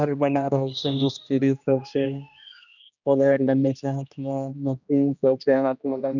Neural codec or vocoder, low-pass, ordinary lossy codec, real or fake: codec, 16 kHz, 1 kbps, X-Codec, HuBERT features, trained on general audio; 7.2 kHz; none; fake